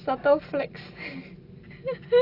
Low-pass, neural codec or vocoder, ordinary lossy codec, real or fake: 5.4 kHz; none; AAC, 32 kbps; real